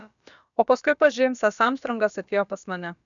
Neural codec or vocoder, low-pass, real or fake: codec, 16 kHz, about 1 kbps, DyCAST, with the encoder's durations; 7.2 kHz; fake